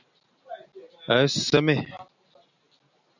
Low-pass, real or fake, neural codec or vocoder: 7.2 kHz; real; none